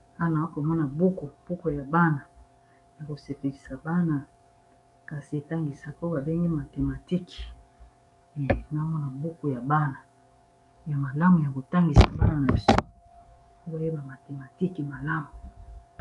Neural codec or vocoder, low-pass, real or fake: codec, 44.1 kHz, 7.8 kbps, DAC; 10.8 kHz; fake